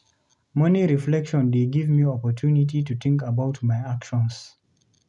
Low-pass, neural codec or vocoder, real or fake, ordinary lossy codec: 10.8 kHz; none; real; none